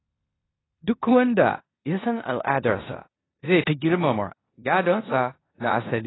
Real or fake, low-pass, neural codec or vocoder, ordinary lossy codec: fake; 7.2 kHz; codec, 16 kHz in and 24 kHz out, 0.9 kbps, LongCat-Audio-Codec, four codebook decoder; AAC, 16 kbps